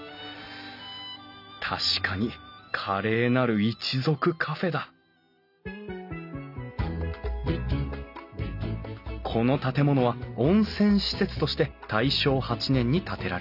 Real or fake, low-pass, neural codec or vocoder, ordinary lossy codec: real; 5.4 kHz; none; none